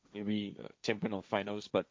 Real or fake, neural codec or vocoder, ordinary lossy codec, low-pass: fake; codec, 16 kHz, 1.1 kbps, Voila-Tokenizer; none; none